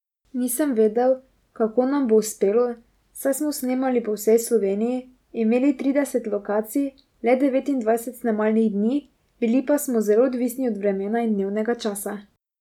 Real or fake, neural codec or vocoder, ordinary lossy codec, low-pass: real; none; none; 19.8 kHz